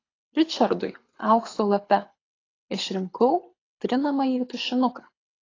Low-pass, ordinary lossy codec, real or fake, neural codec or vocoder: 7.2 kHz; AAC, 32 kbps; fake; codec, 24 kHz, 6 kbps, HILCodec